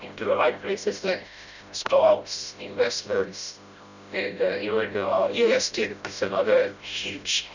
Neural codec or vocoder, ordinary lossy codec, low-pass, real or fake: codec, 16 kHz, 0.5 kbps, FreqCodec, smaller model; none; 7.2 kHz; fake